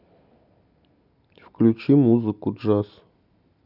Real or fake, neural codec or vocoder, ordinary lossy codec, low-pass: real; none; none; 5.4 kHz